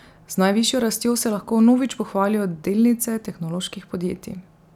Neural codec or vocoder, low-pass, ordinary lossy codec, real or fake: none; 19.8 kHz; none; real